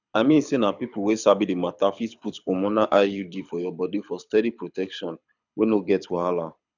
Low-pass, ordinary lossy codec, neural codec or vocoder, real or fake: 7.2 kHz; none; codec, 24 kHz, 6 kbps, HILCodec; fake